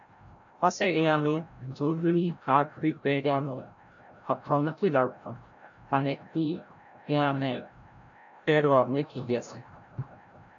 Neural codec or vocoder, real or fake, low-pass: codec, 16 kHz, 0.5 kbps, FreqCodec, larger model; fake; 7.2 kHz